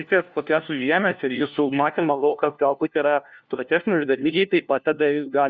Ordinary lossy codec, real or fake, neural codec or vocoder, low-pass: Opus, 64 kbps; fake; codec, 16 kHz, 1 kbps, FunCodec, trained on LibriTTS, 50 frames a second; 7.2 kHz